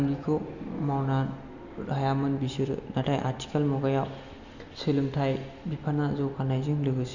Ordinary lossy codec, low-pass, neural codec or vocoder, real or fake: Opus, 64 kbps; 7.2 kHz; none; real